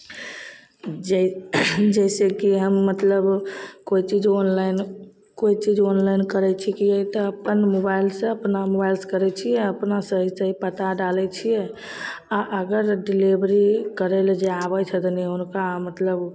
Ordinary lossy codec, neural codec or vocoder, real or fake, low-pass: none; none; real; none